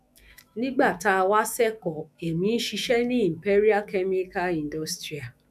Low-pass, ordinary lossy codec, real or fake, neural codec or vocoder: 14.4 kHz; none; fake; autoencoder, 48 kHz, 128 numbers a frame, DAC-VAE, trained on Japanese speech